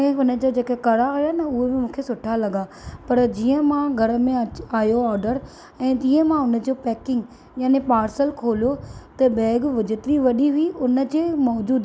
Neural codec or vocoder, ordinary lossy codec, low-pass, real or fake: none; none; none; real